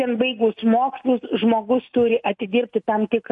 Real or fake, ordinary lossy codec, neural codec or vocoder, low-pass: real; MP3, 48 kbps; none; 10.8 kHz